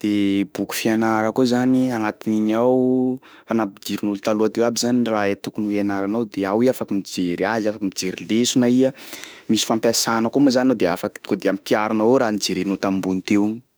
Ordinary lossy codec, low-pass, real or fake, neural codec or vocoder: none; none; fake; autoencoder, 48 kHz, 32 numbers a frame, DAC-VAE, trained on Japanese speech